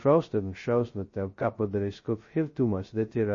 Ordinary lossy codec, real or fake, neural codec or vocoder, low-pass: MP3, 32 kbps; fake; codec, 16 kHz, 0.2 kbps, FocalCodec; 7.2 kHz